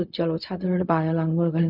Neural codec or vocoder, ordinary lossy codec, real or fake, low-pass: codec, 16 kHz, 0.4 kbps, LongCat-Audio-Codec; none; fake; 5.4 kHz